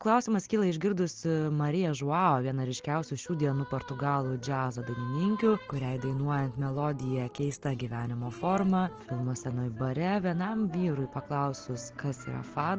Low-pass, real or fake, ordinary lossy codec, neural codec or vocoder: 7.2 kHz; real; Opus, 16 kbps; none